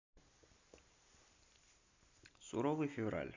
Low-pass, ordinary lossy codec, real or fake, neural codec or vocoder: 7.2 kHz; AAC, 48 kbps; real; none